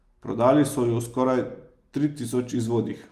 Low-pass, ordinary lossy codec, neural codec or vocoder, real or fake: 14.4 kHz; Opus, 32 kbps; none; real